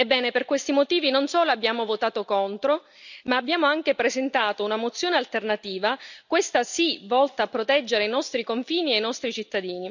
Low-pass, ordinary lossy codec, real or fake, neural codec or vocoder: 7.2 kHz; none; real; none